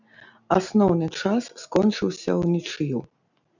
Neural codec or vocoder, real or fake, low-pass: none; real; 7.2 kHz